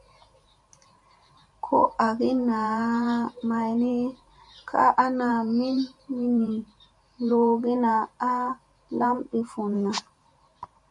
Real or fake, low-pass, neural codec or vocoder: fake; 10.8 kHz; vocoder, 44.1 kHz, 128 mel bands every 512 samples, BigVGAN v2